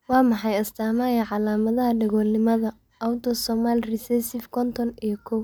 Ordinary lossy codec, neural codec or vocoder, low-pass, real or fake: none; none; none; real